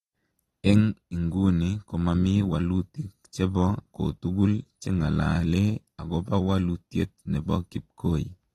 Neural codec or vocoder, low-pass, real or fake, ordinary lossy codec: none; 19.8 kHz; real; AAC, 32 kbps